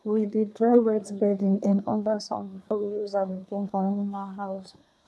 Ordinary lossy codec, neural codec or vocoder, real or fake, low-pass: none; codec, 24 kHz, 1 kbps, SNAC; fake; none